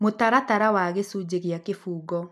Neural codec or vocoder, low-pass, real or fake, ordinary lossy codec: none; 14.4 kHz; real; none